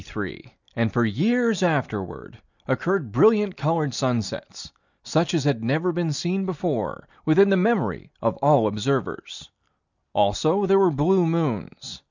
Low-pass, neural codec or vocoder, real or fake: 7.2 kHz; none; real